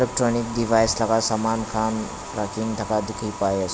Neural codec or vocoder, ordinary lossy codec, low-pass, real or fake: none; none; none; real